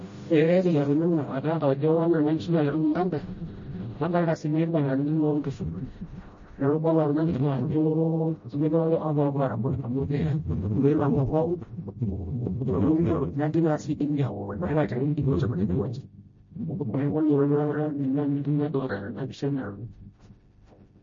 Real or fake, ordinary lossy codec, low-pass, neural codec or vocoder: fake; MP3, 32 kbps; 7.2 kHz; codec, 16 kHz, 0.5 kbps, FreqCodec, smaller model